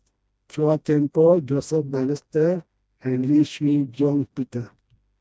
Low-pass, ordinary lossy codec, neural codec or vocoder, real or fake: none; none; codec, 16 kHz, 1 kbps, FreqCodec, smaller model; fake